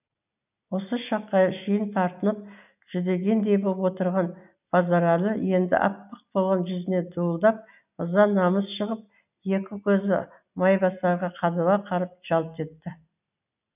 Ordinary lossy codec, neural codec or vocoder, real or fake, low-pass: none; none; real; 3.6 kHz